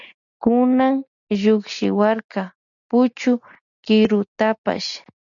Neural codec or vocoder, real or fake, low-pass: none; real; 7.2 kHz